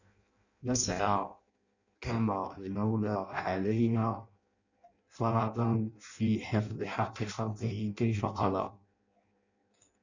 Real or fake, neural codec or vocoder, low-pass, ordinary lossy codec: fake; codec, 16 kHz in and 24 kHz out, 0.6 kbps, FireRedTTS-2 codec; 7.2 kHz; Opus, 64 kbps